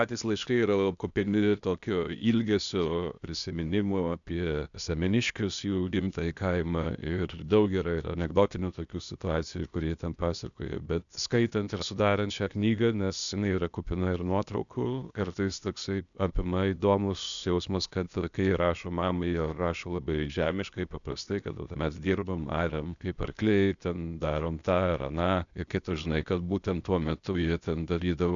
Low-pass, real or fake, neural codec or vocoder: 7.2 kHz; fake; codec, 16 kHz, 0.8 kbps, ZipCodec